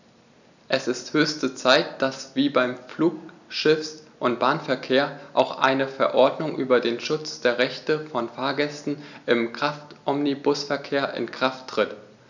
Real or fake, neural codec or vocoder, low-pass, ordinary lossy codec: real; none; 7.2 kHz; none